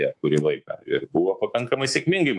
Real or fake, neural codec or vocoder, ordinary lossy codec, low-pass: fake; codec, 24 kHz, 3.1 kbps, DualCodec; AAC, 64 kbps; 10.8 kHz